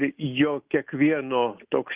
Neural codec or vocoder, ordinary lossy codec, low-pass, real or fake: none; Opus, 32 kbps; 3.6 kHz; real